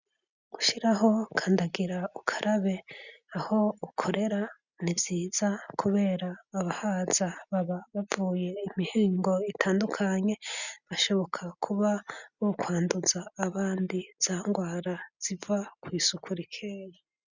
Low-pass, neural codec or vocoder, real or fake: 7.2 kHz; none; real